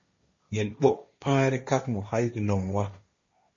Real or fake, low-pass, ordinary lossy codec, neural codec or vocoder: fake; 7.2 kHz; MP3, 32 kbps; codec, 16 kHz, 1.1 kbps, Voila-Tokenizer